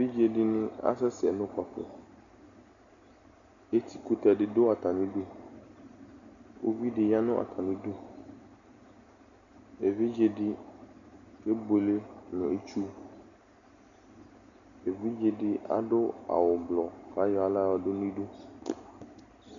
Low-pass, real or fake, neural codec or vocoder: 7.2 kHz; real; none